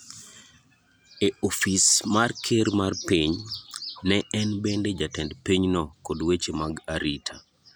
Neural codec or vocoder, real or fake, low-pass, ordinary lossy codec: none; real; none; none